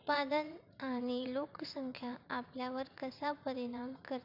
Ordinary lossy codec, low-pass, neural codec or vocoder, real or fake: none; 5.4 kHz; vocoder, 44.1 kHz, 80 mel bands, Vocos; fake